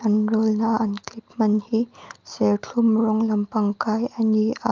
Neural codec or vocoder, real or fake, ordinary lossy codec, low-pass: none; real; Opus, 24 kbps; 7.2 kHz